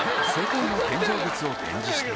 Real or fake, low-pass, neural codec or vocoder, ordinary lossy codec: real; none; none; none